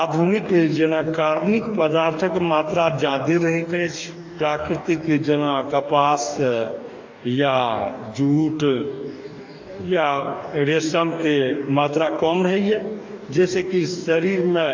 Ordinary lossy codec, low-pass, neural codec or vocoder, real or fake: AAC, 48 kbps; 7.2 kHz; codec, 44.1 kHz, 2.6 kbps, DAC; fake